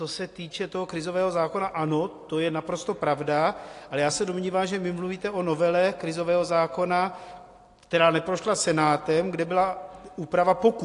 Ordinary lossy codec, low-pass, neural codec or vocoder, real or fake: AAC, 48 kbps; 10.8 kHz; none; real